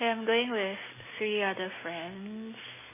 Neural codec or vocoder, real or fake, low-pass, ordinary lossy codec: none; real; 3.6 kHz; MP3, 16 kbps